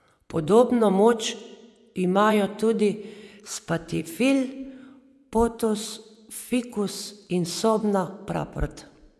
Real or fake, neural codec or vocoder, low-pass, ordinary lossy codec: fake; vocoder, 24 kHz, 100 mel bands, Vocos; none; none